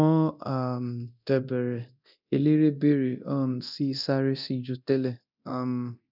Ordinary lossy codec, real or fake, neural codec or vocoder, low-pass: none; fake; codec, 24 kHz, 0.9 kbps, DualCodec; 5.4 kHz